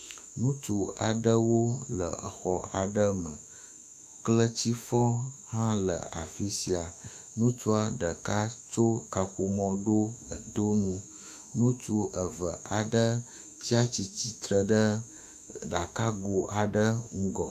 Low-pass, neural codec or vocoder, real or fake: 14.4 kHz; autoencoder, 48 kHz, 32 numbers a frame, DAC-VAE, trained on Japanese speech; fake